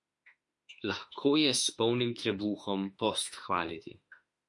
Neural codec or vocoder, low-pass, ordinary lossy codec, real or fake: autoencoder, 48 kHz, 32 numbers a frame, DAC-VAE, trained on Japanese speech; 10.8 kHz; MP3, 48 kbps; fake